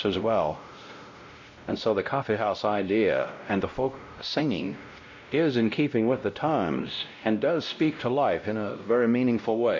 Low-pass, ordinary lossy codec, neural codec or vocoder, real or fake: 7.2 kHz; MP3, 48 kbps; codec, 16 kHz, 0.5 kbps, X-Codec, WavLM features, trained on Multilingual LibriSpeech; fake